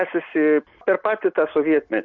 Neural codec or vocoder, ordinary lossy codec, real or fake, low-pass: none; MP3, 48 kbps; real; 7.2 kHz